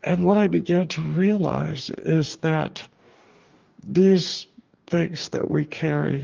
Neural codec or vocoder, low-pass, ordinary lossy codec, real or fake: codec, 44.1 kHz, 2.6 kbps, DAC; 7.2 kHz; Opus, 24 kbps; fake